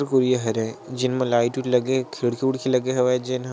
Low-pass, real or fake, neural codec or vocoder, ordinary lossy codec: none; real; none; none